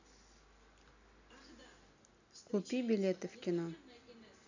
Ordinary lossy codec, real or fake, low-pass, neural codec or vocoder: none; real; 7.2 kHz; none